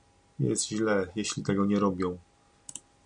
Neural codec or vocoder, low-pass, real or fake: none; 9.9 kHz; real